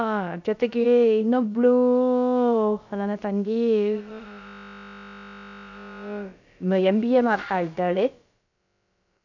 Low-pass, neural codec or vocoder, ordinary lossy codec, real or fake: 7.2 kHz; codec, 16 kHz, about 1 kbps, DyCAST, with the encoder's durations; none; fake